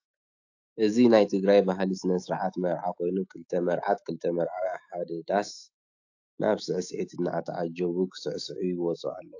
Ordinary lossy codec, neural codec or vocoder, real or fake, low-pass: AAC, 48 kbps; autoencoder, 48 kHz, 128 numbers a frame, DAC-VAE, trained on Japanese speech; fake; 7.2 kHz